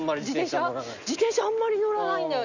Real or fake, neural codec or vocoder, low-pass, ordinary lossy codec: real; none; 7.2 kHz; none